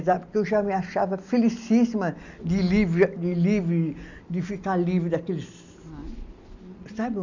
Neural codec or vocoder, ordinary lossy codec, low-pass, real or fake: none; none; 7.2 kHz; real